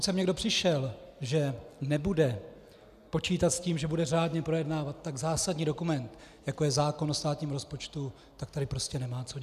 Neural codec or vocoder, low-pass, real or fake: none; 14.4 kHz; real